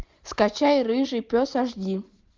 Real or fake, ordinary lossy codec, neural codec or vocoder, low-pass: real; Opus, 32 kbps; none; 7.2 kHz